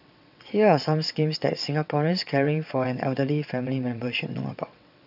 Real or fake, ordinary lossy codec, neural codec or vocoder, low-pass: fake; none; vocoder, 22.05 kHz, 80 mel bands, WaveNeXt; 5.4 kHz